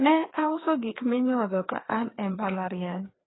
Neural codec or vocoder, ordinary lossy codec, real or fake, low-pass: codec, 16 kHz, 2 kbps, FreqCodec, larger model; AAC, 16 kbps; fake; 7.2 kHz